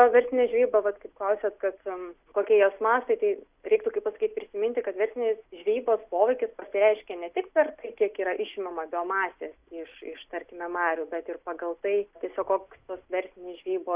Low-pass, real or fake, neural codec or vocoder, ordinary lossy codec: 3.6 kHz; real; none; Opus, 64 kbps